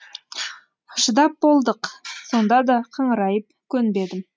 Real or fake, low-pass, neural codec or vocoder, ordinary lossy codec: real; none; none; none